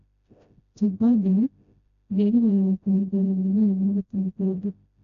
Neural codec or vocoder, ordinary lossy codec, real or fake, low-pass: codec, 16 kHz, 0.5 kbps, FreqCodec, smaller model; none; fake; 7.2 kHz